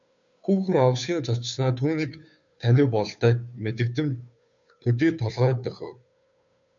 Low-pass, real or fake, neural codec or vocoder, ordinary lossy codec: 7.2 kHz; fake; codec, 16 kHz, 2 kbps, FunCodec, trained on Chinese and English, 25 frames a second; MP3, 96 kbps